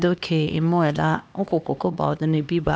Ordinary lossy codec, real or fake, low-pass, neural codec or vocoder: none; fake; none; codec, 16 kHz, 2 kbps, X-Codec, HuBERT features, trained on LibriSpeech